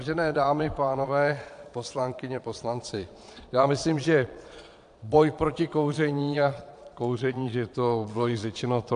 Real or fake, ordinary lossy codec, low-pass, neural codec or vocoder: fake; AAC, 64 kbps; 9.9 kHz; vocoder, 22.05 kHz, 80 mel bands, Vocos